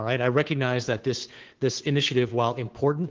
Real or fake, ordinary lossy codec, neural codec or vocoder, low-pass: fake; Opus, 16 kbps; codec, 16 kHz, 2 kbps, FunCodec, trained on Chinese and English, 25 frames a second; 7.2 kHz